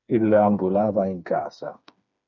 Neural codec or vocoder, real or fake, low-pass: codec, 16 kHz, 4 kbps, FreqCodec, smaller model; fake; 7.2 kHz